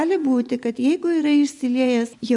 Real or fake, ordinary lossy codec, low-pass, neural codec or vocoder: fake; MP3, 96 kbps; 10.8 kHz; vocoder, 24 kHz, 100 mel bands, Vocos